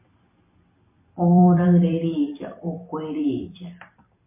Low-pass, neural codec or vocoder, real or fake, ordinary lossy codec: 3.6 kHz; vocoder, 44.1 kHz, 128 mel bands every 256 samples, BigVGAN v2; fake; MP3, 24 kbps